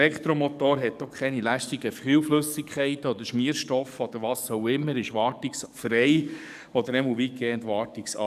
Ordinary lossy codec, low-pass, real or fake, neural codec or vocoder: none; 14.4 kHz; fake; codec, 44.1 kHz, 7.8 kbps, DAC